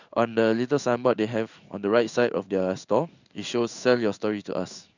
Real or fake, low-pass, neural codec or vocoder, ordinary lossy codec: fake; 7.2 kHz; codec, 16 kHz in and 24 kHz out, 1 kbps, XY-Tokenizer; none